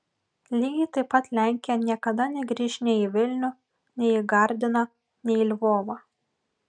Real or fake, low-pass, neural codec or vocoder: real; 9.9 kHz; none